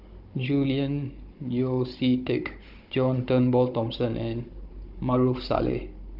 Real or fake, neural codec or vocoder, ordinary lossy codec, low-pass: fake; codec, 16 kHz, 16 kbps, FunCodec, trained on Chinese and English, 50 frames a second; Opus, 24 kbps; 5.4 kHz